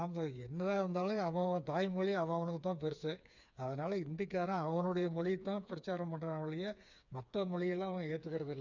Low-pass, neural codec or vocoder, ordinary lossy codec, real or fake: 7.2 kHz; codec, 16 kHz, 4 kbps, FreqCodec, smaller model; none; fake